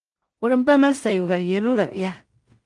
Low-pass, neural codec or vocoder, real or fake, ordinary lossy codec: 10.8 kHz; codec, 16 kHz in and 24 kHz out, 0.4 kbps, LongCat-Audio-Codec, two codebook decoder; fake; Opus, 24 kbps